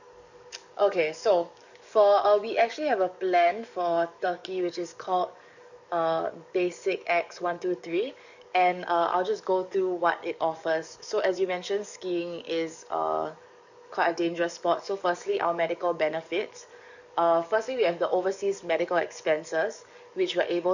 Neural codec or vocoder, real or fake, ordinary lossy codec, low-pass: codec, 44.1 kHz, 7.8 kbps, DAC; fake; none; 7.2 kHz